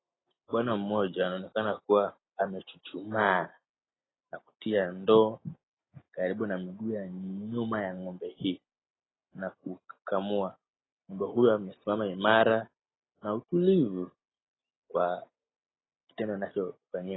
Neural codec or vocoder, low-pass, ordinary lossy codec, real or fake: none; 7.2 kHz; AAC, 16 kbps; real